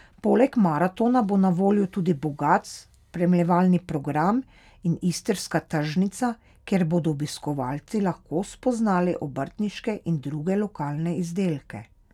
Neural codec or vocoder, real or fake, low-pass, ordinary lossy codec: none; real; 19.8 kHz; none